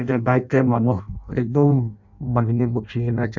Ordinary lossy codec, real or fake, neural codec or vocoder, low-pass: none; fake; codec, 16 kHz in and 24 kHz out, 0.6 kbps, FireRedTTS-2 codec; 7.2 kHz